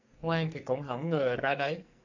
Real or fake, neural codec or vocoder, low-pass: fake; codec, 44.1 kHz, 2.6 kbps, SNAC; 7.2 kHz